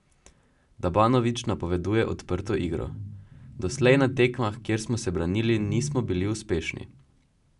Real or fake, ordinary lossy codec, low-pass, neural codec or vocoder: real; none; 10.8 kHz; none